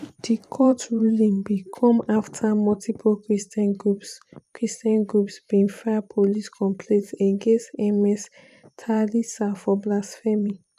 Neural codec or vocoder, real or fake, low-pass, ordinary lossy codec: vocoder, 44.1 kHz, 128 mel bands every 512 samples, BigVGAN v2; fake; 14.4 kHz; none